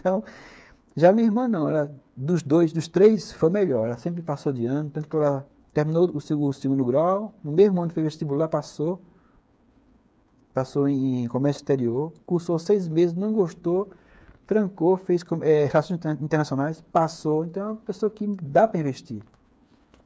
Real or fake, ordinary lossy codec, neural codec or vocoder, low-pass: fake; none; codec, 16 kHz, 8 kbps, FreqCodec, smaller model; none